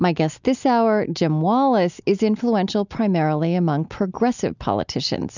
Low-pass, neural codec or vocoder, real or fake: 7.2 kHz; none; real